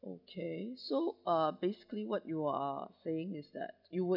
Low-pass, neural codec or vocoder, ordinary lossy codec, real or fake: 5.4 kHz; none; none; real